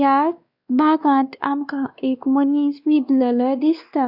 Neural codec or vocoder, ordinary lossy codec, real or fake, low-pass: codec, 16 kHz, 2 kbps, X-Codec, WavLM features, trained on Multilingual LibriSpeech; Opus, 64 kbps; fake; 5.4 kHz